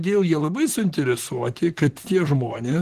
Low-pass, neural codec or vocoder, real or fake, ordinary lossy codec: 14.4 kHz; vocoder, 44.1 kHz, 128 mel bands, Pupu-Vocoder; fake; Opus, 16 kbps